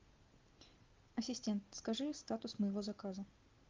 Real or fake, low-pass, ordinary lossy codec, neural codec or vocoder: real; 7.2 kHz; Opus, 32 kbps; none